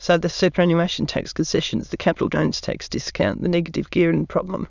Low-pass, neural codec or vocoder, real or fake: 7.2 kHz; autoencoder, 22.05 kHz, a latent of 192 numbers a frame, VITS, trained on many speakers; fake